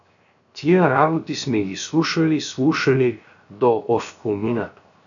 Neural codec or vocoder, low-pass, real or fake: codec, 16 kHz, 0.7 kbps, FocalCodec; 7.2 kHz; fake